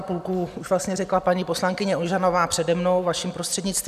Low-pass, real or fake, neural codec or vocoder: 14.4 kHz; real; none